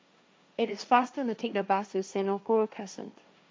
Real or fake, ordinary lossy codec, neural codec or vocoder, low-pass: fake; none; codec, 16 kHz, 1.1 kbps, Voila-Tokenizer; none